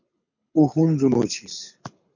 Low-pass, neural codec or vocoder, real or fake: 7.2 kHz; codec, 24 kHz, 6 kbps, HILCodec; fake